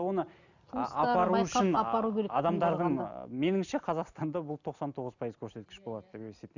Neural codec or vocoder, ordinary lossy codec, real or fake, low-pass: none; none; real; 7.2 kHz